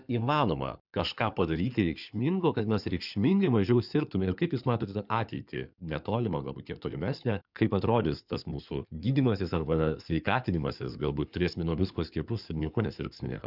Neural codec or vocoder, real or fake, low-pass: codec, 16 kHz in and 24 kHz out, 2.2 kbps, FireRedTTS-2 codec; fake; 5.4 kHz